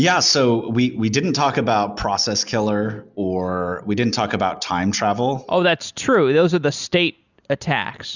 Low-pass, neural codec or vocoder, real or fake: 7.2 kHz; none; real